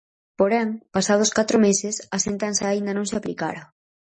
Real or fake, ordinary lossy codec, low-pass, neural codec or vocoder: real; MP3, 32 kbps; 10.8 kHz; none